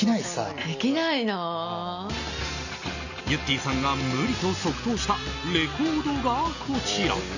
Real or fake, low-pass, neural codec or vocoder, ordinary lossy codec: real; 7.2 kHz; none; none